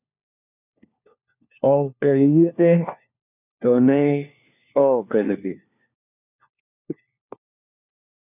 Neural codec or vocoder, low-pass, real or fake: codec, 16 kHz, 1 kbps, FunCodec, trained on LibriTTS, 50 frames a second; 3.6 kHz; fake